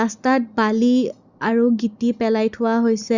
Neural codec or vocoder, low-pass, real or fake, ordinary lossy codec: none; 7.2 kHz; real; Opus, 64 kbps